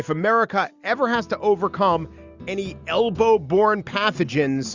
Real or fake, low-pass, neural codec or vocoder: real; 7.2 kHz; none